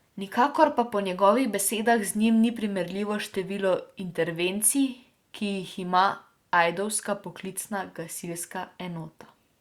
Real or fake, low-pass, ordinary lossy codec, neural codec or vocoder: real; 19.8 kHz; Opus, 64 kbps; none